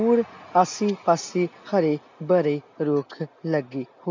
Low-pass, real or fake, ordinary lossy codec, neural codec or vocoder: 7.2 kHz; real; MP3, 64 kbps; none